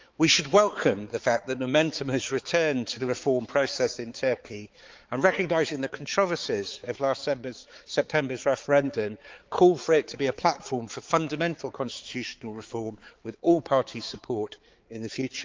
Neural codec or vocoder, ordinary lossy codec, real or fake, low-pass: codec, 16 kHz, 4 kbps, X-Codec, HuBERT features, trained on balanced general audio; Opus, 32 kbps; fake; 7.2 kHz